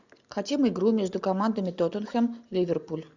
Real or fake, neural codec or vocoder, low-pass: real; none; 7.2 kHz